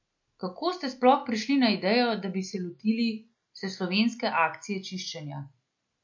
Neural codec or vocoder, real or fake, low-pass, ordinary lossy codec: none; real; 7.2 kHz; MP3, 48 kbps